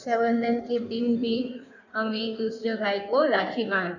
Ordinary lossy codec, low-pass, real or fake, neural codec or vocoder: none; 7.2 kHz; fake; codec, 16 kHz in and 24 kHz out, 1.1 kbps, FireRedTTS-2 codec